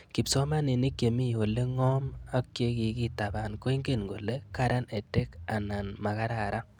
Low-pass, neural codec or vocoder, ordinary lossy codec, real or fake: 19.8 kHz; none; none; real